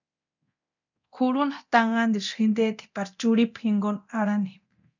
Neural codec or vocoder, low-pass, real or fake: codec, 24 kHz, 0.9 kbps, DualCodec; 7.2 kHz; fake